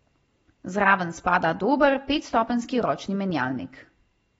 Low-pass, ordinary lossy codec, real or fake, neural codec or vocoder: 19.8 kHz; AAC, 24 kbps; real; none